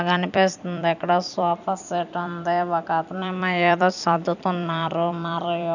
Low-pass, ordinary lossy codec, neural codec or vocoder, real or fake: 7.2 kHz; none; vocoder, 44.1 kHz, 128 mel bands every 256 samples, BigVGAN v2; fake